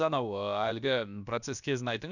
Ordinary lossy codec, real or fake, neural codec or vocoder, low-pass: none; fake; codec, 16 kHz, about 1 kbps, DyCAST, with the encoder's durations; 7.2 kHz